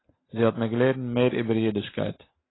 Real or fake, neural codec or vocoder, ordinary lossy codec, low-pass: real; none; AAC, 16 kbps; 7.2 kHz